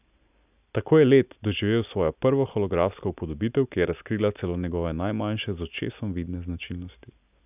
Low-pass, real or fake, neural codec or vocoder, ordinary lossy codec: 3.6 kHz; real; none; none